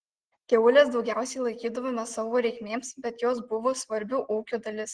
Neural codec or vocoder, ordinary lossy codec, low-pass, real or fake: none; Opus, 16 kbps; 9.9 kHz; real